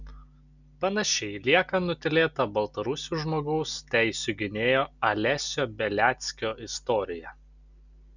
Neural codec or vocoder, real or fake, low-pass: none; real; 7.2 kHz